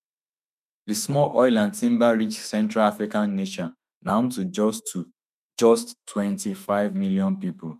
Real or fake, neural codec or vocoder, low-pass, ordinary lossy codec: fake; autoencoder, 48 kHz, 32 numbers a frame, DAC-VAE, trained on Japanese speech; 14.4 kHz; none